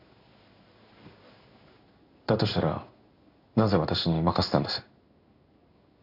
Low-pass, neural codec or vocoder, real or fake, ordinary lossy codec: 5.4 kHz; codec, 16 kHz in and 24 kHz out, 1 kbps, XY-Tokenizer; fake; none